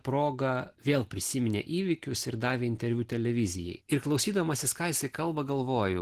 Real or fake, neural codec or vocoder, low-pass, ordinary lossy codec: real; none; 14.4 kHz; Opus, 16 kbps